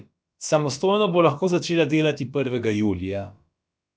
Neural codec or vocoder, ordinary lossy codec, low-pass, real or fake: codec, 16 kHz, about 1 kbps, DyCAST, with the encoder's durations; none; none; fake